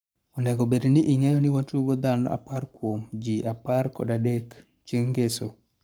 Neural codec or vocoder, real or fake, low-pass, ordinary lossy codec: codec, 44.1 kHz, 7.8 kbps, Pupu-Codec; fake; none; none